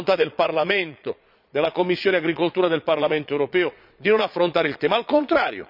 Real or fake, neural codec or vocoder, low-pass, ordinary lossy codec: fake; vocoder, 22.05 kHz, 80 mel bands, Vocos; 5.4 kHz; none